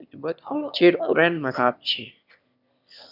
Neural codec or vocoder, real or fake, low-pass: autoencoder, 22.05 kHz, a latent of 192 numbers a frame, VITS, trained on one speaker; fake; 5.4 kHz